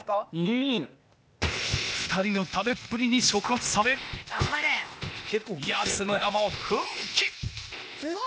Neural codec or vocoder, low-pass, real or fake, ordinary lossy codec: codec, 16 kHz, 0.8 kbps, ZipCodec; none; fake; none